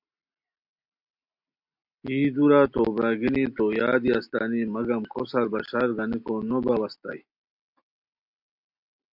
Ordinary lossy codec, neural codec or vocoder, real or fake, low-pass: MP3, 48 kbps; none; real; 5.4 kHz